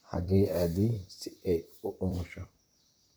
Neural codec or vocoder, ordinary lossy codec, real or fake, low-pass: vocoder, 44.1 kHz, 128 mel bands, Pupu-Vocoder; none; fake; none